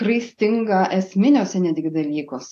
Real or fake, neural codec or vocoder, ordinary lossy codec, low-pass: real; none; AAC, 64 kbps; 14.4 kHz